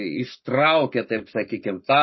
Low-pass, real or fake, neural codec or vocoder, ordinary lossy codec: 7.2 kHz; fake; codec, 44.1 kHz, 7.8 kbps, Pupu-Codec; MP3, 24 kbps